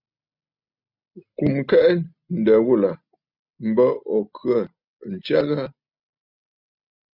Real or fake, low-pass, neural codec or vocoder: real; 5.4 kHz; none